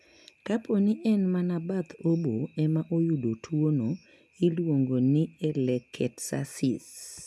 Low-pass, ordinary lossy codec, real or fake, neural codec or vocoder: none; none; real; none